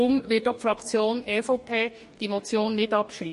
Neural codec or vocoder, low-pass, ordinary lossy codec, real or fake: codec, 44.1 kHz, 2.6 kbps, DAC; 14.4 kHz; MP3, 48 kbps; fake